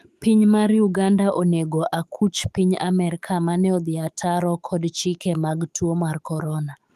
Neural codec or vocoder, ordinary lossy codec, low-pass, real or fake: autoencoder, 48 kHz, 128 numbers a frame, DAC-VAE, trained on Japanese speech; Opus, 32 kbps; 14.4 kHz; fake